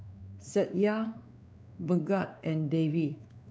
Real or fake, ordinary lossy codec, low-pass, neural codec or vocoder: fake; none; none; codec, 16 kHz, 2 kbps, X-Codec, WavLM features, trained on Multilingual LibriSpeech